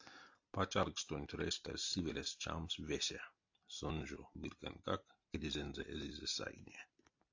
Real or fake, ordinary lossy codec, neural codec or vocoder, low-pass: real; AAC, 48 kbps; none; 7.2 kHz